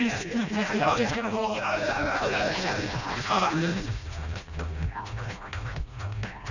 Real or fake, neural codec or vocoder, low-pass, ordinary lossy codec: fake; codec, 16 kHz, 1 kbps, FreqCodec, smaller model; 7.2 kHz; none